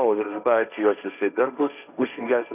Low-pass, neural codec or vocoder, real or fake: 3.6 kHz; codec, 16 kHz, 1.1 kbps, Voila-Tokenizer; fake